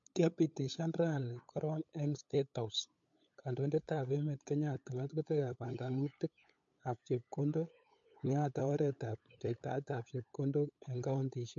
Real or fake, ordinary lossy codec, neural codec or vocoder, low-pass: fake; MP3, 48 kbps; codec, 16 kHz, 8 kbps, FunCodec, trained on LibriTTS, 25 frames a second; 7.2 kHz